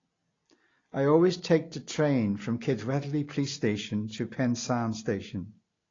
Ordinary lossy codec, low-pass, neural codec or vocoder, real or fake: AAC, 32 kbps; 7.2 kHz; none; real